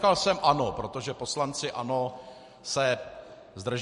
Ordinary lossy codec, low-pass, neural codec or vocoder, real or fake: MP3, 48 kbps; 14.4 kHz; none; real